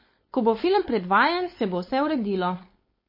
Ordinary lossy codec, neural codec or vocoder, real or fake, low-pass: MP3, 24 kbps; codec, 16 kHz, 4.8 kbps, FACodec; fake; 5.4 kHz